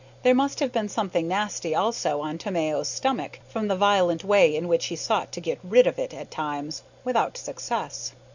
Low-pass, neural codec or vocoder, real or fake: 7.2 kHz; none; real